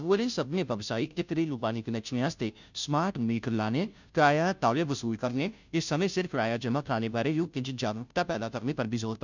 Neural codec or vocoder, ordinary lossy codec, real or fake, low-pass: codec, 16 kHz, 0.5 kbps, FunCodec, trained on Chinese and English, 25 frames a second; none; fake; 7.2 kHz